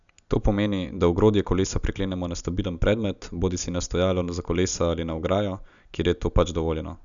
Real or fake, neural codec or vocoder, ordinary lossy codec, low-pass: real; none; none; 7.2 kHz